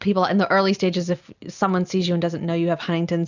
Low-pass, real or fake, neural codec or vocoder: 7.2 kHz; real; none